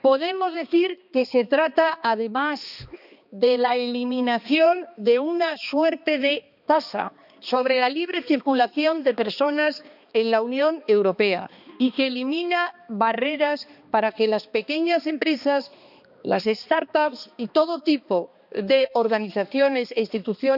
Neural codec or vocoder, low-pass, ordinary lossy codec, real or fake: codec, 16 kHz, 2 kbps, X-Codec, HuBERT features, trained on balanced general audio; 5.4 kHz; none; fake